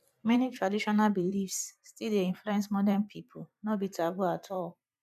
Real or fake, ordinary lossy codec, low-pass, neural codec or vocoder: fake; none; 14.4 kHz; vocoder, 44.1 kHz, 128 mel bands every 512 samples, BigVGAN v2